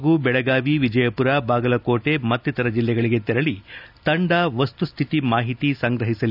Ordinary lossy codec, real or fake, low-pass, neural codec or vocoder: none; real; 5.4 kHz; none